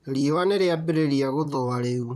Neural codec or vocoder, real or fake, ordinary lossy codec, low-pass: vocoder, 44.1 kHz, 128 mel bands, Pupu-Vocoder; fake; none; 14.4 kHz